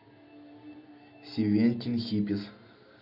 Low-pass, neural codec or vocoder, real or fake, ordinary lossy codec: 5.4 kHz; none; real; none